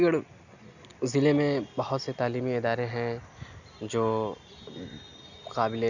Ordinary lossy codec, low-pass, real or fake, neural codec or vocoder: none; 7.2 kHz; real; none